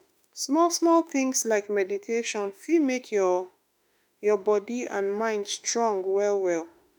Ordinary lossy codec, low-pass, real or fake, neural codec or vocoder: none; none; fake; autoencoder, 48 kHz, 32 numbers a frame, DAC-VAE, trained on Japanese speech